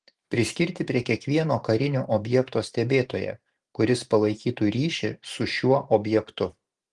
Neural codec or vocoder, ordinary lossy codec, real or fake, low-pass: vocoder, 44.1 kHz, 128 mel bands every 512 samples, BigVGAN v2; Opus, 24 kbps; fake; 10.8 kHz